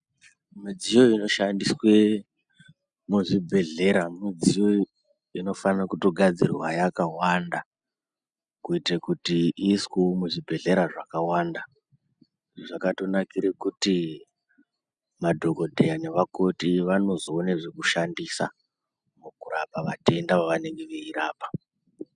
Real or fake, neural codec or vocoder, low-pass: real; none; 9.9 kHz